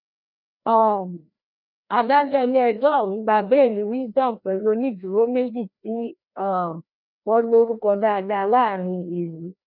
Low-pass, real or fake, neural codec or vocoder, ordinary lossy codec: 5.4 kHz; fake; codec, 16 kHz, 1 kbps, FreqCodec, larger model; none